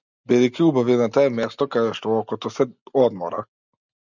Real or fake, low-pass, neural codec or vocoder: real; 7.2 kHz; none